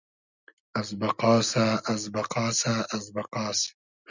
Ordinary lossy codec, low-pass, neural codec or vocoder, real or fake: Opus, 64 kbps; 7.2 kHz; none; real